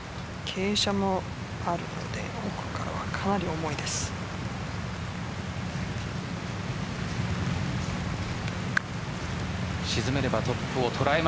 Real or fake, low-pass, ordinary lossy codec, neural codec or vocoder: real; none; none; none